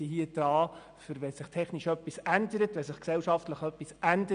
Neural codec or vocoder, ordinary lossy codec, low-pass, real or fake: none; MP3, 96 kbps; 9.9 kHz; real